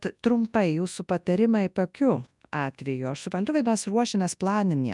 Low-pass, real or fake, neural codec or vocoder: 10.8 kHz; fake; codec, 24 kHz, 0.9 kbps, WavTokenizer, large speech release